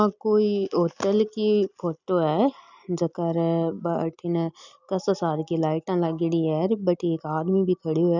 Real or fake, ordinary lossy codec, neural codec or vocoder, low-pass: real; none; none; 7.2 kHz